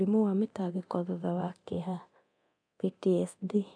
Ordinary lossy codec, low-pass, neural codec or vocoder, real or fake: none; 9.9 kHz; codec, 24 kHz, 0.9 kbps, DualCodec; fake